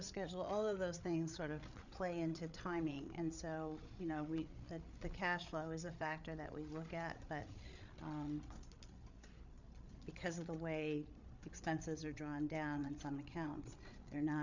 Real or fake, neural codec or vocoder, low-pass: fake; codec, 16 kHz, 8 kbps, FreqCodec, larger model; 7.2 kHz